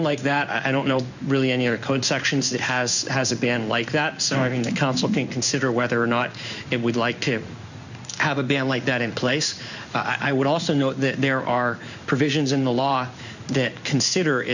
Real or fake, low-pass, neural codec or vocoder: fake; 7.2 kHz; codec, 16 kHz in and 24 kHz out, 1 kbps, XY-Tokenizer